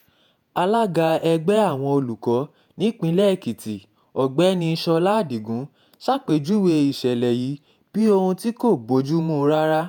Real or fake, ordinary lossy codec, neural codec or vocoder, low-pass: fake; none; vocoder, 48 kHz, 128 mel bands, Vocos; none